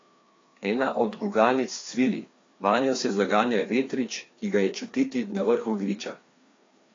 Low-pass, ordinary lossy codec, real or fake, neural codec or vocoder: 7.2 kHz; AAC, 32 kbps; fake; codec, 16 kHz, 2 kbps, FreqCodec, larger model